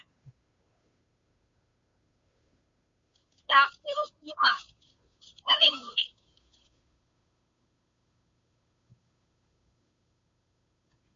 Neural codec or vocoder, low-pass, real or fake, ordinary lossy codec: codec, 16 kHz, 2 kbps, FunCodec, trained on Chinese and English, 25 frames a second; 7.2 kHz; fake; MP3, 48 kbps